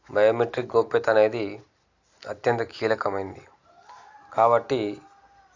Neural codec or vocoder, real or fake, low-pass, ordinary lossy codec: none; real; 7.2 kHz; AAC, 48 kbps